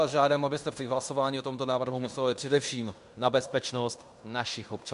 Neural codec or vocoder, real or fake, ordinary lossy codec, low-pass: codec, 16 kHz in and 24 kHz out, 0.9 kbps, LongCat-Audio-Codec, fine tuned four codebook decoder; fake; MP3, 64 kbps; 10.8 kHz